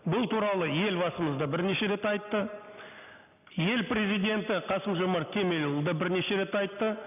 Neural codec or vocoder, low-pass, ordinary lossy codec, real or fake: none; 3.6 kHz; none; real